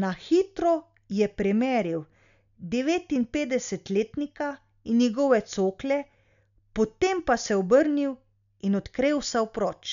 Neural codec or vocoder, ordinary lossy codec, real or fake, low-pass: none; none; real; 7.2 kHz